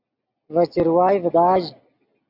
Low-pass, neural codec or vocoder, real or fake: 5.4 kHz; none; real